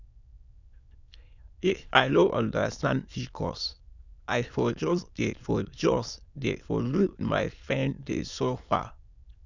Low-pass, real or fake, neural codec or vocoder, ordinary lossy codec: 7.2 kHz; fake; autoencoder, 22.05 kHz, a latent of 192 numbers a frame, VITS, trained on many speakers; none